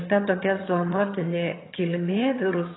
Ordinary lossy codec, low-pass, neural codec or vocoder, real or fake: AAC, 16 kbps; 7.2 kHz; vocoder, 22.05 kHz, 80 mel bands, HiFi-GAN; fake